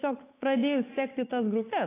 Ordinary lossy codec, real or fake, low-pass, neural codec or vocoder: AAC, 16 kbps; fake; 3.6 kHz; codec, 16 kHz, 8 kbps, FunCodec, trained on Chinese and English, 25 frames a second